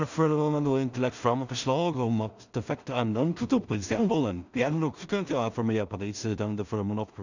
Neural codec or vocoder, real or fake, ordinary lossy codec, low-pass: codec, 16 kHz in and 24 kHz out, 0.4 kbps, LongCat-Audio-Codec, two codebook decoder; fake; none; 7.2 kHz